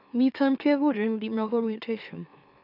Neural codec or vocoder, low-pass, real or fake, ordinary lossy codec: autoencoder, 44.1 kHz, a latent of 192 numbers a frame, MeloTTS; 5.4 kHz; fake; none